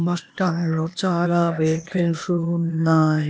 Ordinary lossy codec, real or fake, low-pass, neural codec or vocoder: none; fake; none; codec, 16 kHz, 0.8 kbps, ZipCodec